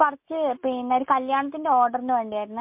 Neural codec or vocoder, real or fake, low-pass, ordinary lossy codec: none; real; 3.6 kHz; MP3, 32 kbps